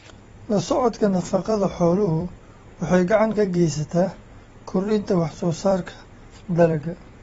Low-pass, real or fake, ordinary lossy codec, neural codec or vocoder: 19.8 kHz; fake; AAC, 24 kbps; vocoder, 44.1 kHz, 128 mel bands every 256 samples, BigVGAN v2